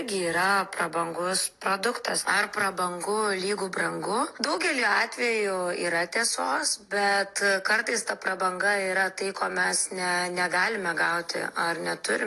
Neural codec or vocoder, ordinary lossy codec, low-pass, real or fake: none; AAC, 48 kbps; 14.4 kHz; real